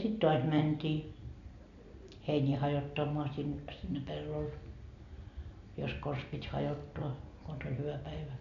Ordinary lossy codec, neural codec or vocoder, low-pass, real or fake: none; none; 7.2 kHz; real